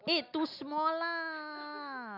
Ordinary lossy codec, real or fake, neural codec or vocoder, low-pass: none; real; none; 5.4 kHz